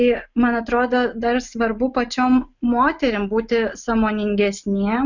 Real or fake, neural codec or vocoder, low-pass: real; none; 7.2 kHz